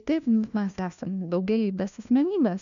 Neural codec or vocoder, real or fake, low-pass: codec, 16 kHz, 1 kbps, FunCodec, trained on LibriTTS, 50 frames a second; fake; 7.2 kHz